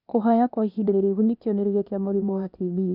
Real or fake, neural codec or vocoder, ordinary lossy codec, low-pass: fake; codec, 16 kHz, 0.8 kbps, ZipCodec; none; 5.4 kHz